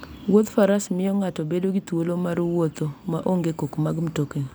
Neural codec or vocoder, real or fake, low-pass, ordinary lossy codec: none; real; none; none